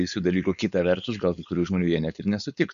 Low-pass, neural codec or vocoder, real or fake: 7.2 kHz; codec, 16 kHz, 4.8 kbps, FACodec; fake